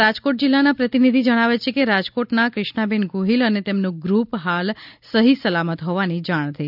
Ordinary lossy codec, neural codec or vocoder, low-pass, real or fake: none; none; 5.4 kHz; real